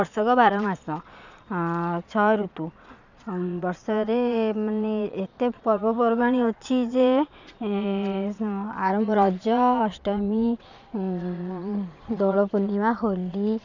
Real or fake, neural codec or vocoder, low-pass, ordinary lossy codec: fake; vocoder, 22.05 kHz, 80 mel bands, Vocos; 7.2 kHz; none